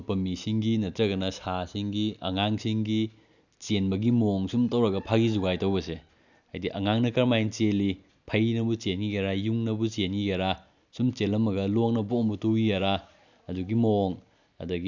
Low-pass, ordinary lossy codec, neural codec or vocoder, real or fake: 7.2 kHz; none; none; real